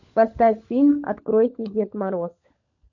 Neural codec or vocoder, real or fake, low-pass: codec, 16 kHz, 16 kbps, FunCodec, trained on LibriTTS, 50 frames a second; fake; 7.2 kHz